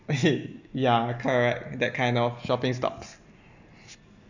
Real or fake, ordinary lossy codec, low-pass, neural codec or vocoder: real; none; 7.2 kHz; none